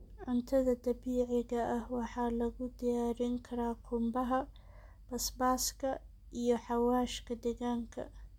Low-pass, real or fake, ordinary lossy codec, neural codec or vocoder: 19.8 kHz; real; MP3, 96 kbps; none